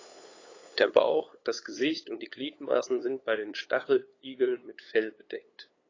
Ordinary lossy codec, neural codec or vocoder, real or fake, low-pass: AAC, 32 kbps; codec, 16 kHz, 8 kbps, FunCodec, trained on LibriTTS, 25 frames a second; fake; 7.2 kHz